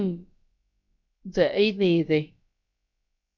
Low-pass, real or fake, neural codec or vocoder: 7.2 kHz; fake; codec, 16 kHz, about 1 kbps, DyCAST, with the encoder's durations